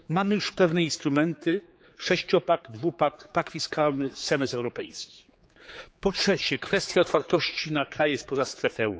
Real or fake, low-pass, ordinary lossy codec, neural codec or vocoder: fake; none; none; codec, 16 kHz, 4 kbps, X-Codec, HuBERT features, trained on general audio